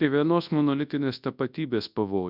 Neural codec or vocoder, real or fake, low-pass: codec, 24 kHz, 0.9 kbps, WavTokenizer, large speech release; fake; 5.4 kHz